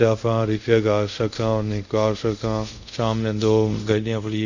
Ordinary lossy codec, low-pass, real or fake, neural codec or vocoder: none; 7.2 kHz; fake; codec, 24 kHz, 0.5 kbps, DualCodec